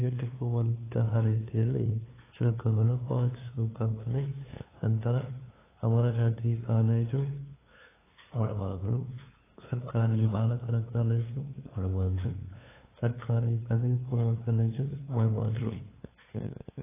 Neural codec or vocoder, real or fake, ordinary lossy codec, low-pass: codec, 16 kHz, 2 kbps, FunCodec, trained on LibriTTS, 25 frames a second; fake; AAC, 16 kbps; 3.6 kHz